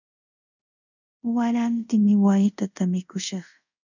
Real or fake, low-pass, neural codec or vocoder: fake; 7.2 kHz; codec, 24 kHz, 0.5 kbps, DualCodec